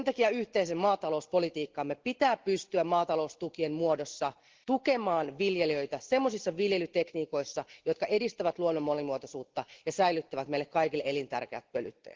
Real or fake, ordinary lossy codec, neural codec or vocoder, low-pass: real; Opus, 16 kbps; none; 7.2 kHz